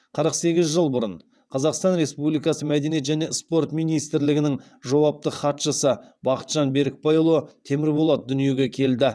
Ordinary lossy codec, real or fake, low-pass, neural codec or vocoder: none; fake; none; vocoder, 22.05 kHz, 80 mel bands, WaveNeXt